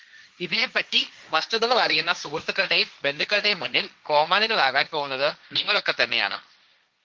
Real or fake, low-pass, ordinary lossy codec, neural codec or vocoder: fake; 7.2 kHz; Opus, 24 kbps; codec, 16 kHz, 1.1 kbps, Voila-Tokenizer